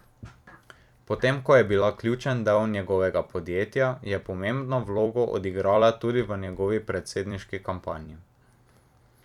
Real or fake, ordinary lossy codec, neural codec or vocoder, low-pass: fake; none; vocoder, 44.1 kHz, 128 mel bands every 256 samples, BigVGAN v2; 19.8 kHz